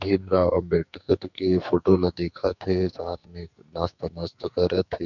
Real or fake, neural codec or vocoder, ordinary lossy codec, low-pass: fake; codec, 44.1 kHz, 2.6 kbps, SNAC; none; 7.2 kHz